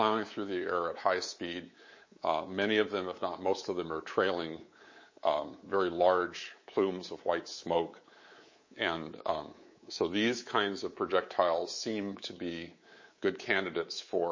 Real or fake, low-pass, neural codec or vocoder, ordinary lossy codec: fake; 7.2 kHz; codec, 24 kHz, 3.1 kbps, DualCodec; MP3, 32 kbps